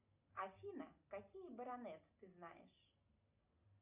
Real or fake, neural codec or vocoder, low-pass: real; none; 3.6 kHz